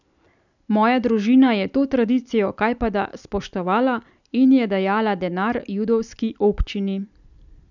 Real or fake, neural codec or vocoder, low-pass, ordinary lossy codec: real; none; 7.2 kHz; none